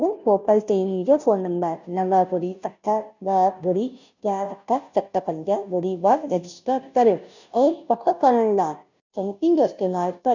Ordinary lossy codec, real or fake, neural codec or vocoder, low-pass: none; fake; codec, 16 kHz, 0.5 kbps, FunCodec, trained on Chinese and English, 25 frames a second; 7.2 kHz